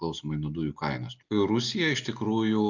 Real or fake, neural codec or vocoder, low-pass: real; none; 7.2 kHz